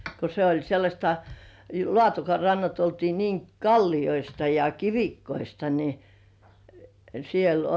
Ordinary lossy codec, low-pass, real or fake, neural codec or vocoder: none; none; real; none